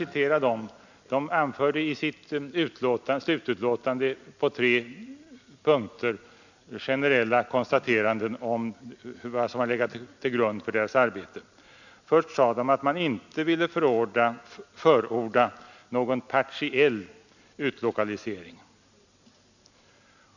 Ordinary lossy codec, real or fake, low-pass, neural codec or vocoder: none; real; 7.2 kHz; none